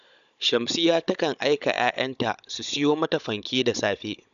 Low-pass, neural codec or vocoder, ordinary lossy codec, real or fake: 7.2 kHz; codec, 16 kHz, 16 kbps, FunCodec, trained on Chinese and English, 50 frames a second; none; fake